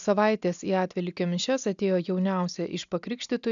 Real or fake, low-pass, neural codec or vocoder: real; 7.2 kHz; none